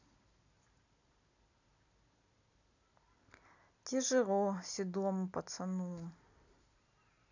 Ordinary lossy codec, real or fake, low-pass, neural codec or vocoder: none; real; 7.2 kHz; none